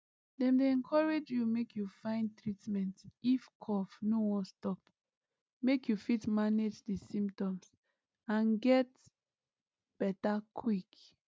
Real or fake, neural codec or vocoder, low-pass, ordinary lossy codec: real; none; none; none